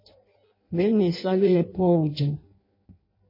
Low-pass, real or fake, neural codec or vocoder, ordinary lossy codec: 5.4 kHz; fake; codec, 16 kHz in and 24 kHz out, 0.6 kbps, FireRedTTS-2 codec; MP3, 24 kbps